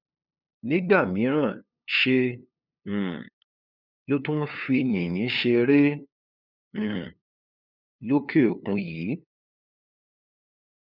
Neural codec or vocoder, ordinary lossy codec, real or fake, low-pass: codec, 16 kHz, 2 kbps, FunCodec, trained on LibriTTS, 25 frames a second; none; fake; 5.4 kHz